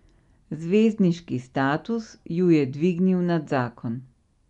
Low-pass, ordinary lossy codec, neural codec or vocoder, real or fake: 10.8 kHz; none; none; real